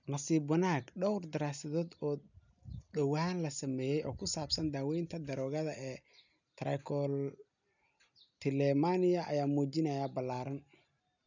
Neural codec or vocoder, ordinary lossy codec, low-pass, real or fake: none; none; 7.2 kHz; real